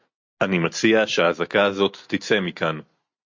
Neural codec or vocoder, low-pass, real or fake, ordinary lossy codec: none; 7.2 kHz; real; MP3, 48 kbps